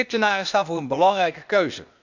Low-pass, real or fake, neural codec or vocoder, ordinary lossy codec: 7.2 kHz; fake; codec, 16 kHz, 0.8 kbps, ZipCodec; none